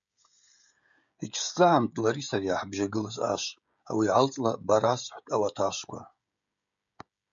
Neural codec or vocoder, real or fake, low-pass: codec, 16 kHz, 16 kbps, FreqCodec, smaller model; fake; 7.2 kHz